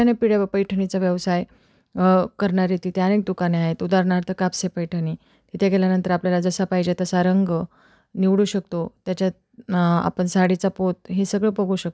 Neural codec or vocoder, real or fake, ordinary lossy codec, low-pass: none; real; none; none